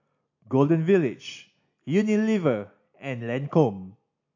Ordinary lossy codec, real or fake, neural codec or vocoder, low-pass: AAC, 48 kbps; real; none; 7.2 kHz